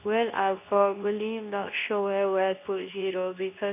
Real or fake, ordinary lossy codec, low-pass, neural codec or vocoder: fake; none; 3.6 kHz; codec, 24 kHz, 0.9 kbps, WavTokenizer, medium speech release version 2